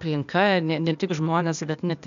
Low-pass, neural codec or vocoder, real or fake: 7.2 kHz; codec, 16 kHz, 0.8 kbps, ZipCodec; fake